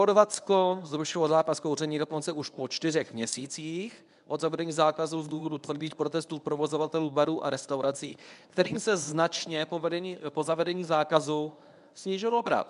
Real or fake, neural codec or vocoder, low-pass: fake; codec, 24 kHz, 0.9 kbps, WavTokenizer, medium speech release version 1; 10.8 kHz